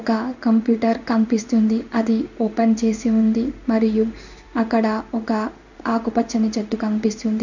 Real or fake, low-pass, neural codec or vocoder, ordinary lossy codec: fake; 7.2 kHz; codec, 16 kHz in and 24 kHz out, 1 kbps, XY-Tokenizer; none